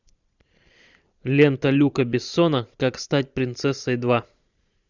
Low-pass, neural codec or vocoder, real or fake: 7.2 kHz; vocoder, 44.1 kHz, 128 mel bands every 512 samples, BigVGAN v2; fake